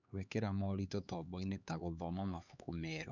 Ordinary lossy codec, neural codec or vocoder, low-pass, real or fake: none; codec, 16 kHz, 4 kbps, X-Codec, HuBERT features, trained on LibriSpeech; 7.2 kHz; fake